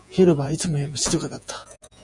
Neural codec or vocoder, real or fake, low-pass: vocoder, 48 kHz, 128 mel bands, Vocos; fake; 10.8 kHz